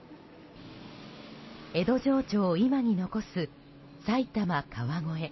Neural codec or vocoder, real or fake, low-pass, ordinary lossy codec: none; real; 7.2 kHz; MP3, 24 kbps